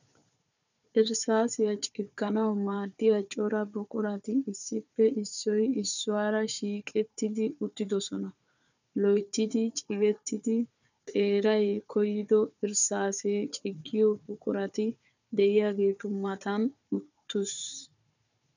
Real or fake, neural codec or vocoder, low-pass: fake; codec, 16 kHz, 4 kbps, FunCodec, trained on Chinese and English, 50 frames a second; 7.2 kHz